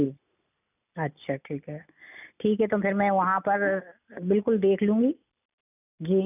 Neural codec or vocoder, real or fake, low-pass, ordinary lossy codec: none; real; 3.6 kHz; none